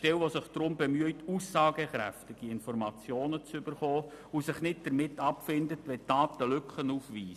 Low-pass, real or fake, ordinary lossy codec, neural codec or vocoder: 14.4 kHz; real; none; none